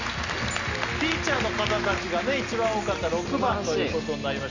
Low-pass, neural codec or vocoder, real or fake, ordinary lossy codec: 7.2 kHz; none; real; Opus, 64 kbps